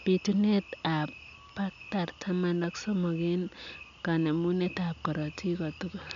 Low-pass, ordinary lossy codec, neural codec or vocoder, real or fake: 7.2 kHz; none; none; real